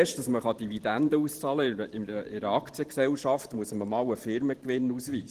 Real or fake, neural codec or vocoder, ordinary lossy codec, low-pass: fake; vocoder, 44.1 kHz, 128 mel bands every 512 samples, BigVGAN v2; Opus, 16 kbps; 14.4 kHz